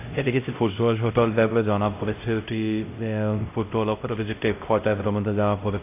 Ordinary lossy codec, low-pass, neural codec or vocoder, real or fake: AAC, 24 kbps; 3.6 kHz; codec, 16 kHz, 0.5 kbps, X-Codec, HuBERT features, trained on LibriSpeech; fake